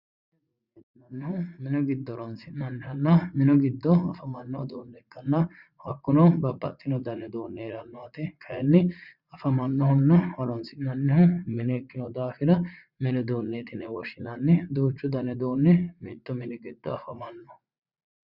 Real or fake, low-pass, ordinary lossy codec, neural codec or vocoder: fake; 5.4 kHz; Opus, 64 kbps; vocoder, 44.1 kHz, 80 mel bands, Vocos